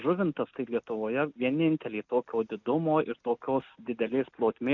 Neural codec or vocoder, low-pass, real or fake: none; 7.2 kHz; real